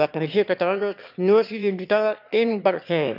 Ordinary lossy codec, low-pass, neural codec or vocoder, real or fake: none; 5.4 kHz; autoencoder, 22.05 kHz, a latent of 192 numbers a frame, VITS, trained on one speaker; fake